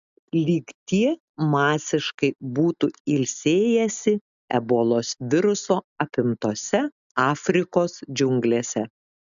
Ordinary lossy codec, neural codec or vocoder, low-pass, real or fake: AAC, 96 kbps; none; 7.2 kHz; real